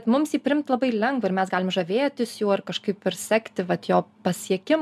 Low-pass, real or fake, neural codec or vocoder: 14.4 kHz; real; none